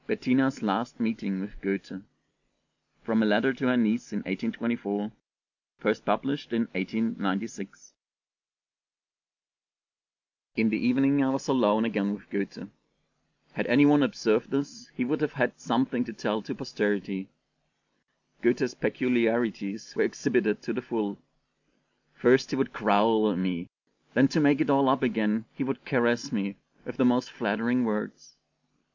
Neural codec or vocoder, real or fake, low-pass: none; real; 7.2 kHz